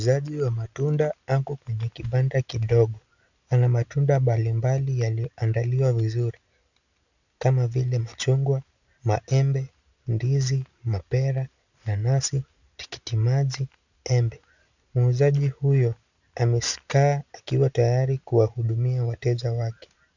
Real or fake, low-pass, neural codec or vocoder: real; 7.2 kHz; none